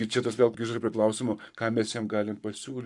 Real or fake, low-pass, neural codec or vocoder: fake; 10.8 kHz; codec, 44.1 kHz, 7.8 kbps, Pupu-Codec